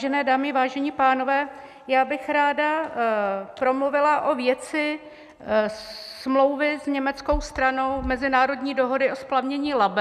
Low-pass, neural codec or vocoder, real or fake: 14.4 kHz; none; real